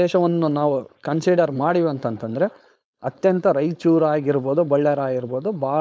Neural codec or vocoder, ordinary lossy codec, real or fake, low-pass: codec, 16 kHz, 4.8 kbps, FACodec; none; fake; none